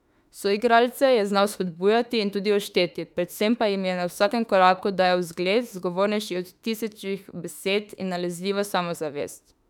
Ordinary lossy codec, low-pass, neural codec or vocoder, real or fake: none; 19.8 kHz; autoencoder, 48 kHz, 32 numbers a frame, DAC-VAE, trained on Japanese speech; fake